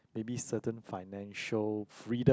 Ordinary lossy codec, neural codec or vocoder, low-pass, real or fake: none; none; none; real